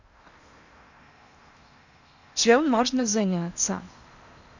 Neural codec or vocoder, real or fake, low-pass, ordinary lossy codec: codec, 16 kHz in and 24 kHz out, 0.8 kbps, FocalCodec, streaming, 65536 codes; fake; 7.2 kHz; none